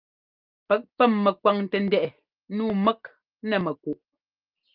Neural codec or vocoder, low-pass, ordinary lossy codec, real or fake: none; 5.4 kHz; Opus, 24 kbps; real